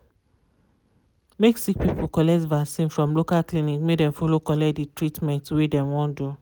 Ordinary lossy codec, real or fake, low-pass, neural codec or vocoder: none; real; none; none